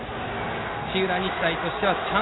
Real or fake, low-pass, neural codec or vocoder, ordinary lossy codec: real; 7.2 kHz; none; AAC, 16 kbps